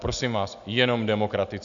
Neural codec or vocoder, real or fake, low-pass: none; real; 7.2 kHz